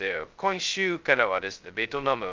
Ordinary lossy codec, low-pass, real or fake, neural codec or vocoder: Opus, 24 kbps; 7.2 kHz; fake; codec, 16 kHz, 0.2 kbps, FocalCodec